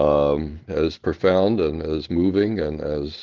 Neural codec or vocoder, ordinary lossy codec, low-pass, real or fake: none; Opus, 16 kbps; 7.2 kHz; real